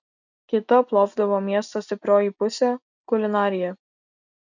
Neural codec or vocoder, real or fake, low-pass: none; real; 7.2 kHz